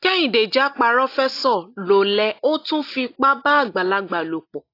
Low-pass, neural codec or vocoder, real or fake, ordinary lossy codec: 5.4 kHz; none; real; AAC, 32 kbps